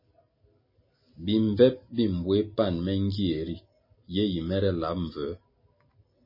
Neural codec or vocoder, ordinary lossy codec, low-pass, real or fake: none; MP3, 24 kbps; 5.4 kHz; real